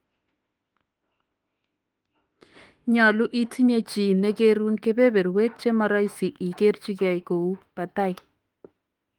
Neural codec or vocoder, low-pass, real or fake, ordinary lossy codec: autoencoder, 48 kHz, 32 numbers a frame, DAC-VAE, trained on Japanese speech; 19.8 kHz; fake; Opus, 24 kbps